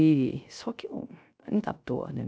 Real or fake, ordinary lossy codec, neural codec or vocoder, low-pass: fake; none; codec, 16 kHz, 0.3 kbps, FocalCodec; none